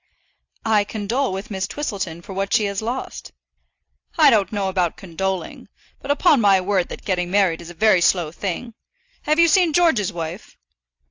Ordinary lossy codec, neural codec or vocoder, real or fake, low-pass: AAC, 48 kbps; none; real; 7.2 kHz